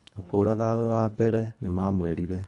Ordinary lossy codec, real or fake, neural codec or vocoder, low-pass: none; fake; codec, 24 kHz, 1.5 kbps, HILCodec; 10.8 kHz